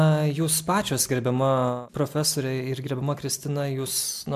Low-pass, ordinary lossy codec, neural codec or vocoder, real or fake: 14.4 kHz; MP3, 96 kbps; none; real